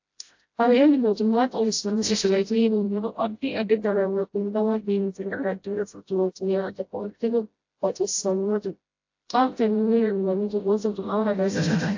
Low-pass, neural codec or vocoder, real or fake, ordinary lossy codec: 7.2 kHz; codec, 16 kHz, 0.5 kbps, FreqCodec, smaller model; fake; AAC, 48 kbps